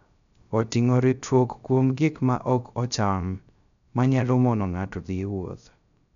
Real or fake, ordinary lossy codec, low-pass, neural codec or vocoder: fake; none; 7.2 kHz; codec, 16 kHz, 0.3 kbps, FocalCodec